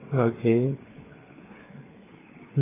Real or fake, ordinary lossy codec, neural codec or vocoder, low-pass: fake; AAC, 16 kbps; codec, 16 kHz, 4 kbps, FreqCodec, larger model; 3.6 kHz